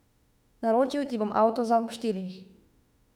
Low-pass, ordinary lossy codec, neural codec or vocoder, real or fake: 19.8 kHz; none; autoencoder, 48 kHz, 32 numbers a frame, DAC-VAE, trained on Japanese speech; fake